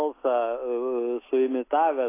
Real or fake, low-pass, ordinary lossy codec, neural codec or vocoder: real; 3.6 kHz; AAC, 24 kbps; none